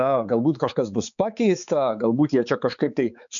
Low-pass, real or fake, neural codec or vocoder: 7.2 kHz; fake; codec, 16 kHz, 4 kbps, X-Codec, HuBERT features, trained on balanced general audio